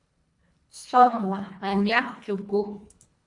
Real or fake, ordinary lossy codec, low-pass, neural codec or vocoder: fake; AAC, 64 kbps; 10.8 kHz; codec, 24 kHz, 1.5 kbps, HILCodec